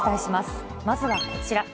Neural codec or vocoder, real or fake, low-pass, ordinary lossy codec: none; real; none; none